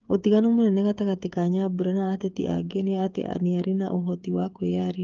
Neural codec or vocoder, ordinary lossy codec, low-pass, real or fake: codec, 16 kHz, 8 kbps, FreqCodec, smaller model; Opus, 24 kbps; 7.2 kHz; fake